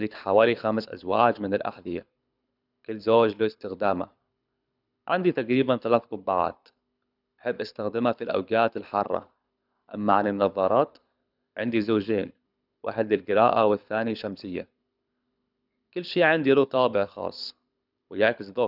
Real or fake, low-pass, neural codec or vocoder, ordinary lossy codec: fake; 5.4 kHz; codec, 24 kHz, 6 kbps, HILCodec; none